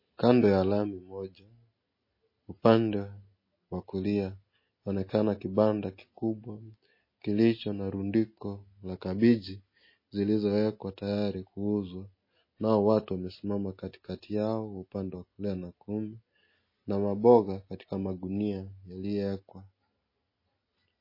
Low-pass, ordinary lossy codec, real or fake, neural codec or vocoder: 5.4 kHz; MP3, 24 kbps; real; none